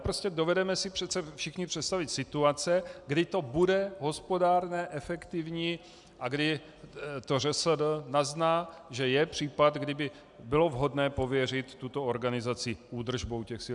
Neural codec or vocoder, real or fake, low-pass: none; real; 10.8 kHz